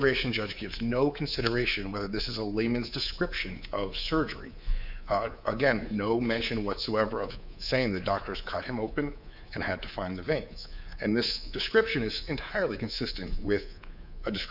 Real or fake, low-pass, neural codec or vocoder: fake; 5.4 kHz; codec, 24 kHz, 3.1 kbps, DualCodec